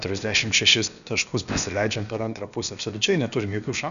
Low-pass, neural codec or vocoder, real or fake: 7.2 kHz; codec, 16 kHz, about 1 kbps, DyCAST, with the encoder's durations; fake